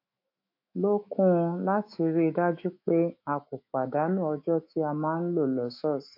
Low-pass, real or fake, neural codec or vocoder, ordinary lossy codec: 5.4 kHz; fake; autoencoder, 48 kHz, 128 numbers a frame, DAC-VAE, trained on Japanese speech; MP3, 32 kbps